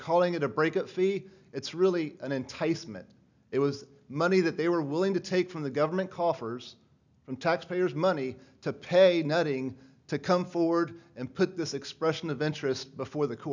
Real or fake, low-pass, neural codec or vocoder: real; 7.2 kHz; none